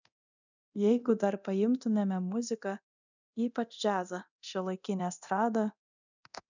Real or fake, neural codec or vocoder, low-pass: fake; codec, 24 kHz, 0.9 kbps, DualCodec; 7.2 kHz